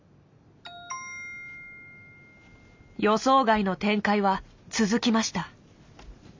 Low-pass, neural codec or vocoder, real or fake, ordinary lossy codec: 7.2 kHz; none; real; MP3, 64 kbps